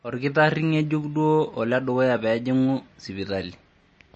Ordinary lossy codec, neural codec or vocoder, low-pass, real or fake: MP3, 32 kbps; none; 10.8 kHz; real